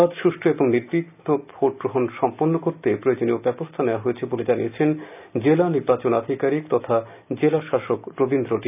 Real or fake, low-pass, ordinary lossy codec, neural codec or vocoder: real; 3.6 kHz; none; none